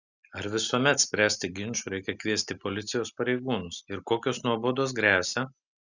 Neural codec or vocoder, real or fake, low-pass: none; real; 7.2 kHz